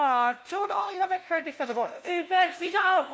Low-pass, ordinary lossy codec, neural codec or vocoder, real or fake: none; none; codec, 16 kHz, 0.5 kbps, FunCodec, trained on LibriTTS, 25 frames a second; fake